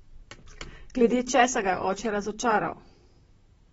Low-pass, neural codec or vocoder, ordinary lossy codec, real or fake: 19.8 kHz; vocoder, 44.1 kHz, 128 mel bands, Pupu-Vocoder; AAC, 24 kbps; fake